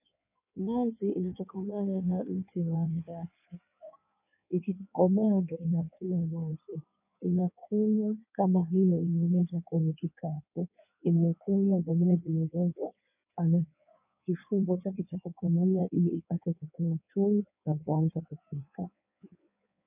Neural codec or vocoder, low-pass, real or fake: codec, 16 kHz in and 24 kHz out, 1.1 kbps, FireRedTTS-2 codec; 3.6 kHz; fake